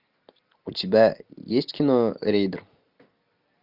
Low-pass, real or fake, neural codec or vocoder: 5.4 kHz; real; none